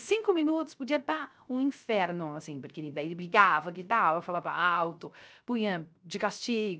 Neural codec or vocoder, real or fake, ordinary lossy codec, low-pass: codec, 16 kHz, 0.3 kbps, FocalCodec; fake; none; none